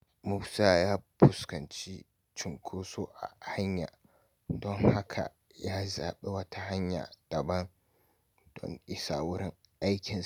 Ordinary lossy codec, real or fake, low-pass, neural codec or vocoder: none; real; none; none